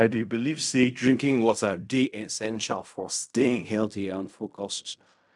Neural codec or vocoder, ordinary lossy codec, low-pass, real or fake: codec, 16 kHz in and 24 kHz out, 0.4 kbps, LongCat-Audio-Codec, fine tuned four codebook decoder; none; 10.8 kHz; fake